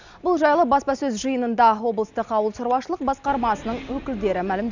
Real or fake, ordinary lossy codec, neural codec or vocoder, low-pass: real; none; none; 7.2 kHz